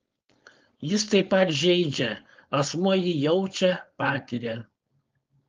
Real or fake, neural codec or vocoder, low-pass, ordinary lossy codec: fake; codec, 16 kHz, 4.8 kbps, FACodec; 7.2 kHz; Opus, 24 kbps